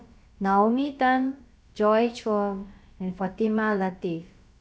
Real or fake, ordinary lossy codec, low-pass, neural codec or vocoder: fake; none; none; codec, 16 kHz, about 1 kbps, DyCAST, with the encoder's durations